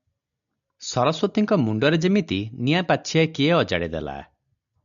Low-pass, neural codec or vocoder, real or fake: 7.2 kHz; none; real